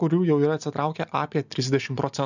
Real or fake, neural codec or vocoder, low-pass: real; none; 7.2 kHz